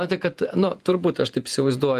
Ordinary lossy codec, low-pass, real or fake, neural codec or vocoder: Opus, 32 kbps; 14.4 kHz; fake; vocoder, 48 kHz, 128 mel bands, Vocos